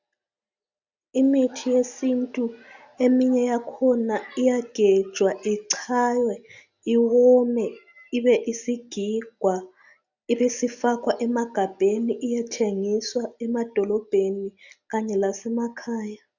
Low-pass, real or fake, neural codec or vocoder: 7.2 kHz; real; none